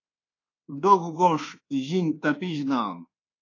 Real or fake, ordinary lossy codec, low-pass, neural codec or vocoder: fake; AAC, 32 kbps; 7.2 kHz; codec, 24 kHz, 1.2 kbps, DualCodec